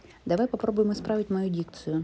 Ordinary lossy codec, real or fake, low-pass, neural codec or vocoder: none; real; none; none